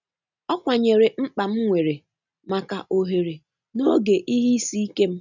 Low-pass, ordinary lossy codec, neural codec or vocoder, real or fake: 7.2 kHz; none; none; real